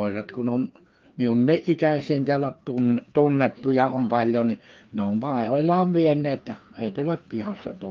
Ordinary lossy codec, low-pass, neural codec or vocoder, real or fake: Opus, 32 kbps; 7.2 kHz; codec, 16 kHz, 2 kbps, FreqCodec, larger model; fake